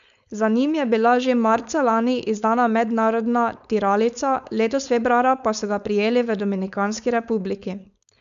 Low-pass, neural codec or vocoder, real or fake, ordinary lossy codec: 7.2 kHz; codec, 16 kHz, 4.8 kbps, FACodec; fake; none